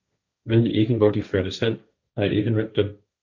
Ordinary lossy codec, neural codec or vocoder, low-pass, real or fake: Opus, 64 kbps; codec, 16 kHz, 1.1 kbps, Voila-Tokenizer; 7.2 kHz; fake